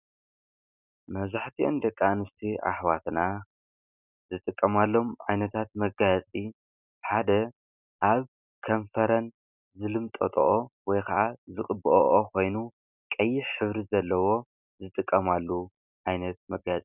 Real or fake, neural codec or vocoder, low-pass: real; none; 3.6 kHz